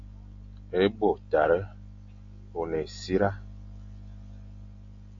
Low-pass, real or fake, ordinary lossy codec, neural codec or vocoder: 7.2 kHz; real; AAC, 48 kbps; none